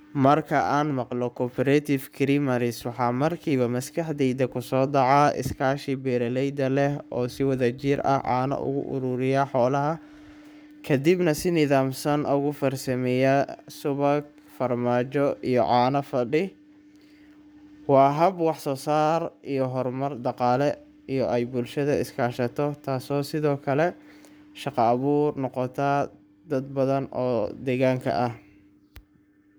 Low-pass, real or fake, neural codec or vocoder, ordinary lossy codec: none; fake; codec, 44.1 kHz, 7.8 kbps, Pupu-Codec; none